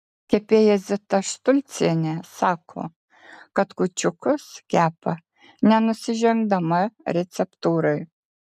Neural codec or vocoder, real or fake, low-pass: none; real; 14.4 kHz